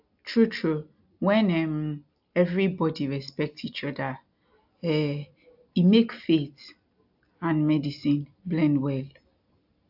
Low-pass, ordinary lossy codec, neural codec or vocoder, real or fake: 5.4 kHz; AAC, 48 kbps; none; real